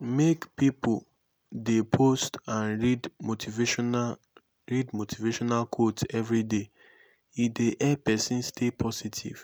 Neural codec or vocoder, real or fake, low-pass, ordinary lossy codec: none; real; none; none